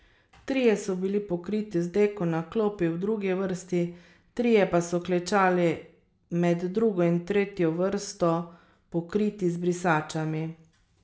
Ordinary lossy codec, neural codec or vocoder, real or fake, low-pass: none; none; real; none